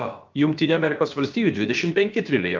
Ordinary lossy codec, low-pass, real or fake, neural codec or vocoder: Opus, 24 kbps; 7.2 kHz; fake; codec, 16 kHz, about 1 kbps, DyCAST, with the encoder's durations